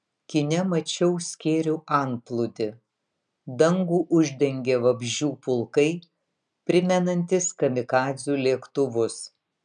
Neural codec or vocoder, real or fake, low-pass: none; real; 9.9 kHz